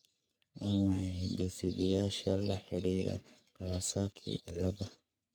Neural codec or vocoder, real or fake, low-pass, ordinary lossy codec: codec, 44.1 kHz, 3.4 kbps, Pupu-Codec; fake; none; none